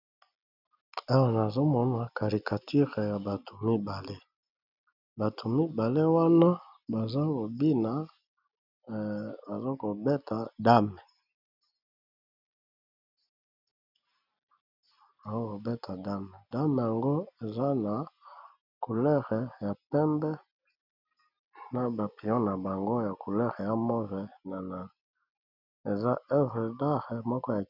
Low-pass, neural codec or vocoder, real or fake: 5.4 kHz; none; real